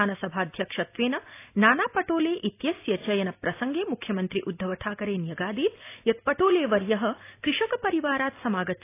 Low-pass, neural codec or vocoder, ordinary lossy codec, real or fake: 3.6 kHz; none; AAC, 24 kbps; real